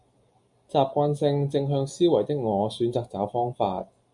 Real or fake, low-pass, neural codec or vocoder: real; 10.8 kHz; none